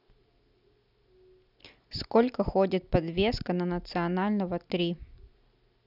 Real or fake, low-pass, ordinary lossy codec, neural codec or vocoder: real; 5.4 kHz; none; none